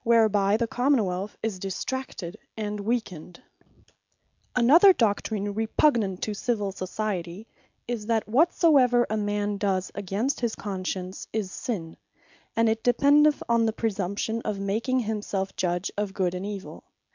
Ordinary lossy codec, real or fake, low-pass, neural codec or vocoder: MP3, 64 kbps; real; 7.2 kHz; none